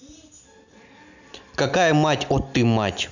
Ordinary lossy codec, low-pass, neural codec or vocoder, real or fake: none; 7.2 kHz; none; real